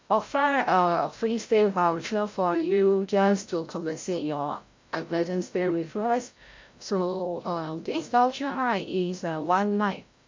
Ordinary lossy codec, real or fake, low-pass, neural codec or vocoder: MP3, 48 kbps; fake; 7.2 kHz; codec, 16 kHz, 0.5 kbps, FreqCodec, larger model